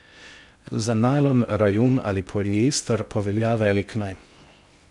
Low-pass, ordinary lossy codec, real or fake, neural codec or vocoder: 10.8 kHz; none; fake; codec, 16 kHz in and 24 kHz out, 0.6 kbps, FocalCodec, streaming, 2048 codes